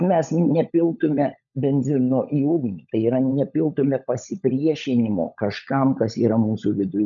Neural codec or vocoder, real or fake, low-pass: codec, 16 kHz, 16 kbps, FunCodec, trained on LibriTTS, 50 frames a second; fake; 7.2 kHz